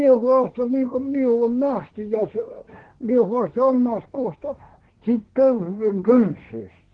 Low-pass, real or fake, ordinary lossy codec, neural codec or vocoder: 9.9 kHz; fake; Opus, 16 kbps; codec, 24 kHz, 1 kbps, SNAC